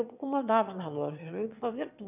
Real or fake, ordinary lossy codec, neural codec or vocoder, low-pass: fake; none; autoencoder, 22.05 kHz, a latent of 192 numbers a frame, VITS, trained on one speaker; 3.6 kHz